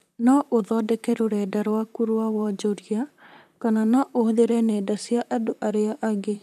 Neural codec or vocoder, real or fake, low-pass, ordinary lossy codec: vocoder, 44.1 kHz, 128 mel bands, Pupu-Vocoder; fake; 14.4 kHz; none